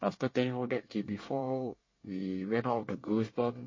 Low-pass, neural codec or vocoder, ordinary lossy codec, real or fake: 7.2 kHz; codec, 24 kHz, 1 kbps, SNAC; MP3, 32 kbps; fake